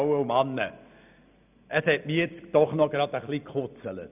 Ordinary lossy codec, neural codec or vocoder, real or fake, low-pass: none; none; real; 3.6 kHz